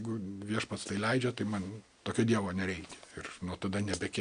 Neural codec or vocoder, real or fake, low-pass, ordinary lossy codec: none; real; 9.9 kHz; AAC, 96 kbps